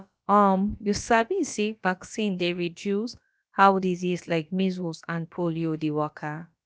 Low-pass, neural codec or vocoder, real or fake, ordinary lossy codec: none; codec, 16 kHz, about 1 kbps, DyCAST, with the encoder's durations; fake; none